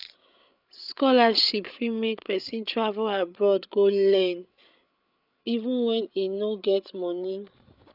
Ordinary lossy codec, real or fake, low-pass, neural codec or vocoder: none; fake; 5.4 kHz; codec, 16 kHz, 8 kbps, FreqCodec, larger model